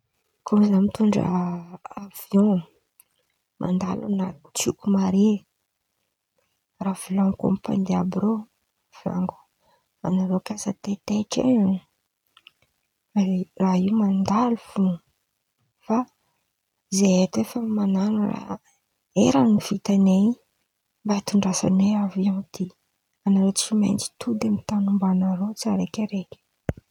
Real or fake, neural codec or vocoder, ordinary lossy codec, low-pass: real; none; none; 19.8 kHz